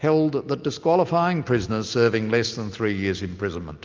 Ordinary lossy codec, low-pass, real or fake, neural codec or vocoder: Opus, 24 kbps; 7.2 kHz; real; none